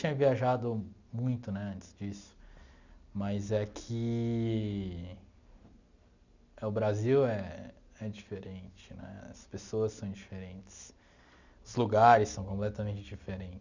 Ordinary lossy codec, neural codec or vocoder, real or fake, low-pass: none; none; real; 7.2 kHz